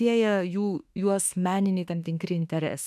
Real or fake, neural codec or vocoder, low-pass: fake; autoencoder, 48 kHz, 32 numbers a frame, DAC-VAE, trained on Japanese speech; 14.4 kHz